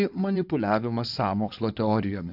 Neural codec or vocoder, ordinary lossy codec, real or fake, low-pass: codec, 16 kHz in and 24 kHz out, 2.2 kbps, FireRedTTS-2 codec; AAC, 48 kbps; fake; 5.4 kHz